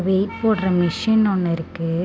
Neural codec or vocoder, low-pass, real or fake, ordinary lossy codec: none; none; real; none